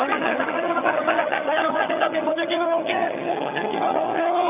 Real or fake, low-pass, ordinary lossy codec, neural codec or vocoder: fake; 3.6 kHz; none; vocoder, 22.05 kHz, 80 mel bands, HiFi-GAN